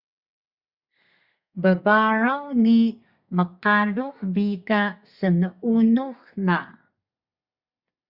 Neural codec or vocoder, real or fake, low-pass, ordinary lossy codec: codec, 32 kHz, 1.9 kbps, SNAC; fake; 5.4 kHz; Opus, 64 kbps